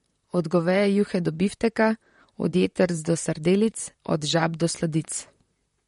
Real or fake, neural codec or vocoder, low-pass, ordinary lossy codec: fake; vocoder, 44.1 kHz, 128 mel bands, Pupu-Vocoder; 19.8 kHz; MP3, 48 kbps